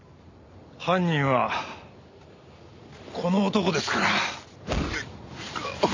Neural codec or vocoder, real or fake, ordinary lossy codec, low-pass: none; real; MP3, 64 kbps; 7.2 kHz